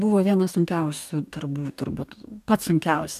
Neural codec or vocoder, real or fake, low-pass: codec, 44.1 kHz, 2.6 kbps, DAC; fake; 14.4 kHz